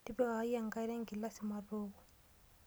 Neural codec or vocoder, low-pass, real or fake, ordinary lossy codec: none; none; real; none